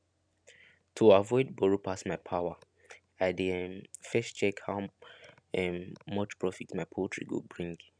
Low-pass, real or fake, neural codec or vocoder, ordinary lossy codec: 9.9 kHz; real; none; none